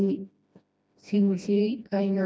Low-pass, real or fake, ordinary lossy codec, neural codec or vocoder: none; fake; none; codec, 16 kHz, 1 kbps, FreqCodec, smaller model